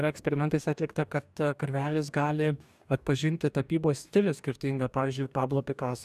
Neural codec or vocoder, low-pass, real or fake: codec, 44.1 kHz, 2.6 kbps, DAC; 14.4 kHz; fake